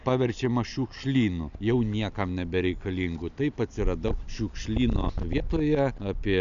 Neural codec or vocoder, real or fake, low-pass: none; real; 7.2 kHz